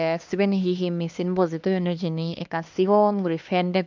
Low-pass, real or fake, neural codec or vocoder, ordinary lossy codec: 7.2 kHz; fake; codec, 16 kHz, 2 kbps, X-Codec, HuBERT features, trained on LibriSpeech; MP3, 64 kbps